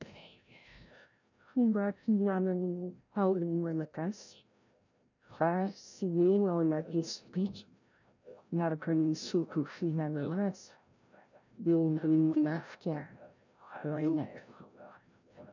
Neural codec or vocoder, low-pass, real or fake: codec, 16 kHz, 0.5 kbps, FreqCodec, larger model; 7.2 kHz; fake